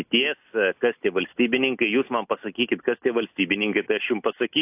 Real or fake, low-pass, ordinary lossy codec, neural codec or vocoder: real; 3.6 kHz; AAC, 32 kbps; none